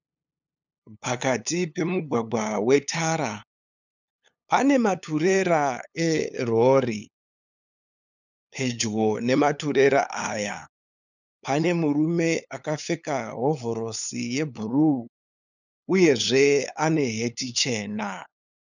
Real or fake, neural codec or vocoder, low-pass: fake; codec, 16 kHz, 8 kbps, FunCodec, trained on LibriTTS, 25 frames a second; 7.2 kHz